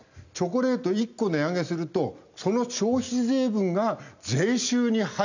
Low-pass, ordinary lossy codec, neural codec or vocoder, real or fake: 7.2 kHz; none; none; real